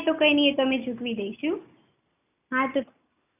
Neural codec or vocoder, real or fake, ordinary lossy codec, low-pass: none; real; none; 3.6 kHz